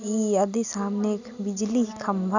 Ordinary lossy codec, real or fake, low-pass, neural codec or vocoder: none; real; 7.2 kHz; none